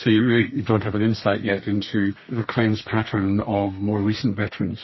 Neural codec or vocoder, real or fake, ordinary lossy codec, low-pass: codec, 32 kHz, 1.9 kbps, SNAC; fake; MP3, 24 kbps; 7.2 kHz